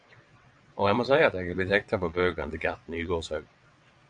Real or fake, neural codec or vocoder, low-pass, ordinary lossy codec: fake; vocoder, 22.05 kHz, 80 mel bands, WaveNeXt; 9.9 kHz; AAC, 64 kbps